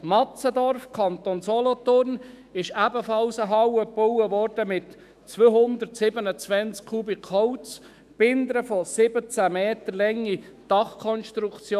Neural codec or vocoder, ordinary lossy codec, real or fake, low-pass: autoencoder, 48 kHz, 128 numbers a frame, DAC-VAE, trained on Japanese speech; none; fake; 14.4 kHz